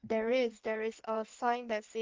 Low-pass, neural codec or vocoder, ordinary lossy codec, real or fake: 7.2 kHz; codec, 16 kHz in and 24 kHz out, 1.1 kbps, FireRedTTS-2 codec; Opus, 32 kbps; fake